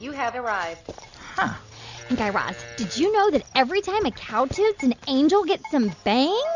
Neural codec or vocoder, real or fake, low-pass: none; real; 7.2 kHz